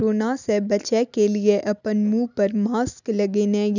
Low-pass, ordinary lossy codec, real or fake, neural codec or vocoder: 7.2 kHz; none; real; none